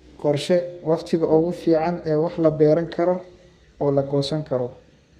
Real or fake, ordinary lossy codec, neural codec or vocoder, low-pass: fake; Opus, 64 kbps; codec, 32 kHz, 1.9 kbps, SNAC; 14.4 kHz